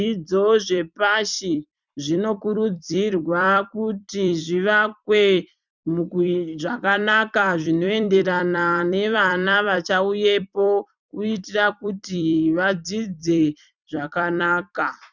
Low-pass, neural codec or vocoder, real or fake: 7.2 kHz; vocoder, 44.1 kHz, 128 mel bands every 256 samples, BigVGAN v2; fake